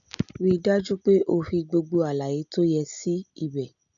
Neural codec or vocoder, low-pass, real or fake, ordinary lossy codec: none; 7.2 kHz; real; none